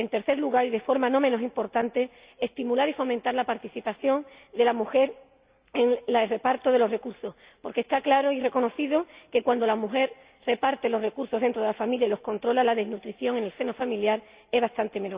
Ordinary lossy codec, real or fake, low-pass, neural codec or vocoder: Opus, 64 kbps; real; 3.6 kHz; none